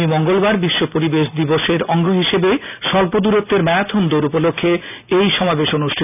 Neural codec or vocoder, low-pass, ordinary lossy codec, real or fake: none; 3.6 kHz; none; real